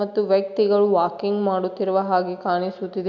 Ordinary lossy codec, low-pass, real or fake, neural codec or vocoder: MP3, 64 kbps; 7.2 kHz; real; none